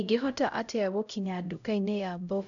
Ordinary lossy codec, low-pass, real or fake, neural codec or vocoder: none; 7.2 kHz; fake; codec, 16 kHz, 0.5 kbps, X-Codec, HuBERT features, trained on LibriSpeech